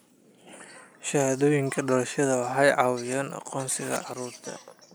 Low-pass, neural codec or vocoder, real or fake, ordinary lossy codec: none; none; real; none